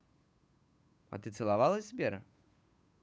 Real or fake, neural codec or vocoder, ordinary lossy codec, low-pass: fake; codec, 16 kHz, 8 kbps, FunCodec, trained on Chinese and English, 25 frames a second; none; none